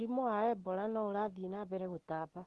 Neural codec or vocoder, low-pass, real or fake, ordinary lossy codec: none; 10.8 kHz; real; Opus, 16 kbps